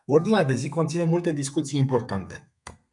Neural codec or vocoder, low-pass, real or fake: codec, 32 kHz, 1.9 kbps, SNAC; 10.8 kHz; fake